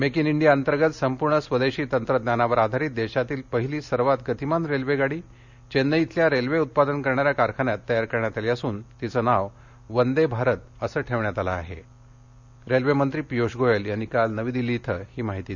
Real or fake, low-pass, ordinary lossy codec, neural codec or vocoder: real; 7.2 kHz; none; none